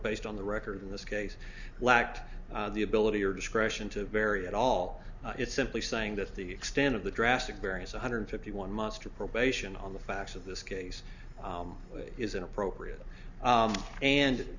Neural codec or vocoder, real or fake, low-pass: none; real; 7.2 kHz